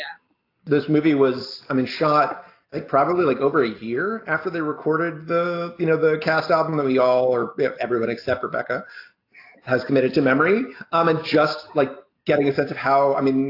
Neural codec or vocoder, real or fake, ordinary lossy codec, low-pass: none; real; AAC, 32 kbps; 5.4 kHz